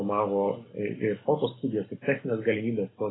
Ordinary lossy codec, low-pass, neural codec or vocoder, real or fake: AAC, 16 kbps; 7.2 kHz; none; real